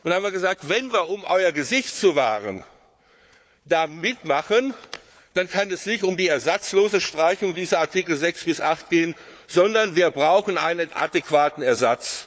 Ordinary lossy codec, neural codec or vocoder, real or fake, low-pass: none; codec, 16 kHz, 4 kbps, FunCodec, trained on Chinese and English, 50 frames a second; fake; none